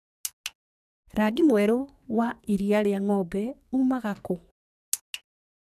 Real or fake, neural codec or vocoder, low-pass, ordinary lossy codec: fake; codec, 44.1 kHz, 2.6 kbps, SNAC; 14.4 kHz; none